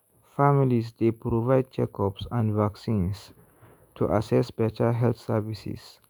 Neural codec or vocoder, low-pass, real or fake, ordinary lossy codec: autoencoder, 48 kHz, 128 numbers a frame, DAC-VAE, trained on Japanese speech; none; fake; none